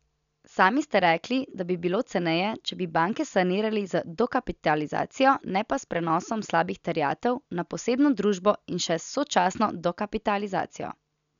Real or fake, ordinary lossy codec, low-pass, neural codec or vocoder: real; none; 7.2 kHz; none